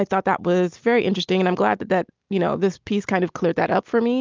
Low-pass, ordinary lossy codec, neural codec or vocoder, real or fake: 7.2 kHz; Opus, 24 kbps; none; real